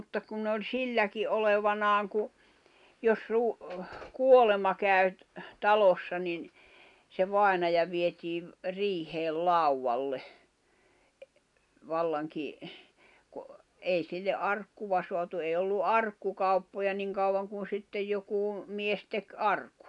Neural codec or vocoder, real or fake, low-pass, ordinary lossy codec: none; real; 10.8 kHz; none